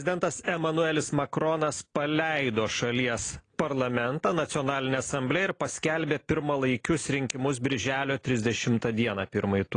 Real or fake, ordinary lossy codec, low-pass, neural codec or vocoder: real; AAC, 32 kbps; 9.9 kHz; none